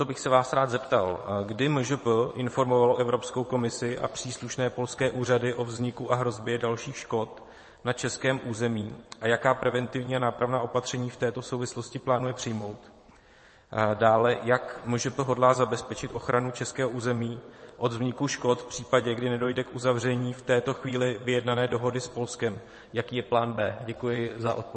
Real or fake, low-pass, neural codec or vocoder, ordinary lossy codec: fake; 9.9 kHz; vocoder, 22.05 kHz, 80 mel bands, WaveNeXt; MP3, 32 kbps